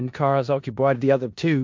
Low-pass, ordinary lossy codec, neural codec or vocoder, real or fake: 7.2 kHz; MP3, 48 kbps; codec, 16 kHz in and 24 kHz out, 0.4 kbps, LongCat-Audio-Codec, four codebook decoder; fake